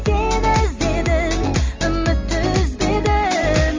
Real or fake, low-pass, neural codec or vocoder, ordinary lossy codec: real; 7.2 kHz; none; Opus, 32 kbps